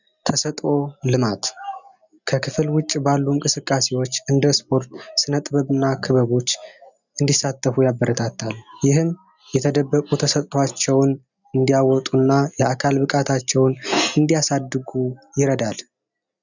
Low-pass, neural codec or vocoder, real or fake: 7.2 kHz; none; real